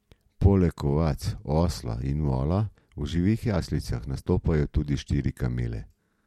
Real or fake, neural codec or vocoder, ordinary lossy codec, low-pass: real; none; MP3, 64 kbps; 19.8 kHz